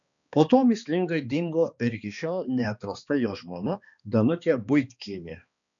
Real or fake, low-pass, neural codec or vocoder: fake; 7.2 kHz; codec, 16 kHz, 2 kbps, X-Codec, HuBERT features, trained on balanced general audio